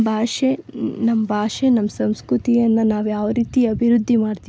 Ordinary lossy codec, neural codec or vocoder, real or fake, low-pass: none; none; real; none